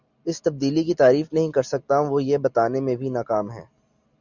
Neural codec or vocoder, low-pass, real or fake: none; 7.2 kHz; real